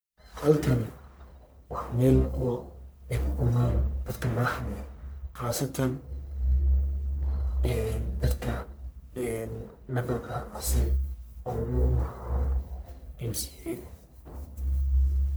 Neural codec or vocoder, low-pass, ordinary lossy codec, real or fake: codec, 44.1 kHz, 1.7 kbps, Pupu-Codec; none; none; fake